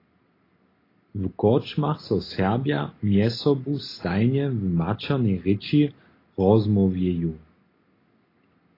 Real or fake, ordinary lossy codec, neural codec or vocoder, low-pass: real; AAC, 24 kbps; none; 5.4 kHz